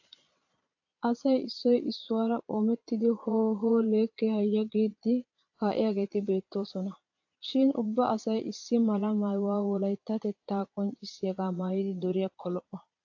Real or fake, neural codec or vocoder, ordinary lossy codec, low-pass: fake; vocoder, 22.05 kHz, 80 mel bands, Vocos; AAC, 48 kbps; 7.2 kHz